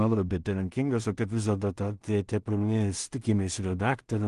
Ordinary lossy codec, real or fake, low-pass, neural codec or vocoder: Opus, 24 kbps; fake; 10.8 kHz; codec, 16 kHz in and 24 kHz out, 0.4 kbps, LongCat-Audio-Codec, two codebook decoder